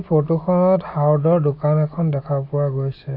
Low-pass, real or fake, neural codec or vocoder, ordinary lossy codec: 5.4 kHz; real; none; AAC, 32 kbps